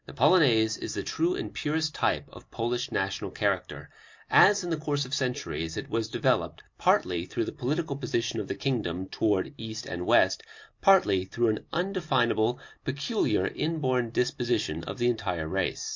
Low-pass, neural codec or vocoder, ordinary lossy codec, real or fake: 7.2 kHz; none; MP3, 48 kbps; real